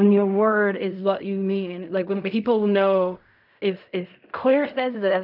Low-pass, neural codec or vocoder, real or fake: 5.4 kHz; codec, 16 kHz in and 24 kHz out, 0.4 kbps, LongCat-Audio-Codec, fine tuned four codebook decoder; fake